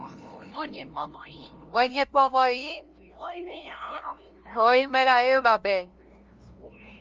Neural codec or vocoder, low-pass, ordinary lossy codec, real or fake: codec, 16 kHz, 0.5 kbps, FunCodec, trained on LibriTTS, 25 frames a second; 7.2 kHz; Opus, 24 kbps; fake